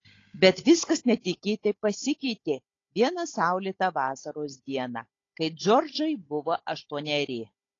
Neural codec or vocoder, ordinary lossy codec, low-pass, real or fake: codec, 16 kHz, 16 kbps, FunCodec, trained on Chinese and English, 50 frames a second; AAC, 32 kbps; 7.2 kHz; fake